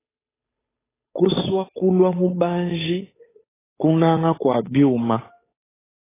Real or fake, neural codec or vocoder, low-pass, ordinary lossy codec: fake; codec, 16 kHz, 8 kbps, FunCodec, trained on Chinese and English, 25 frames a second; 3.6 kHz; AAC, 16 kbps